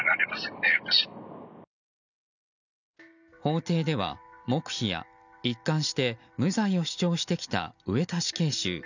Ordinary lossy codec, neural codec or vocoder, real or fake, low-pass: none; none; real; 7.2 kHz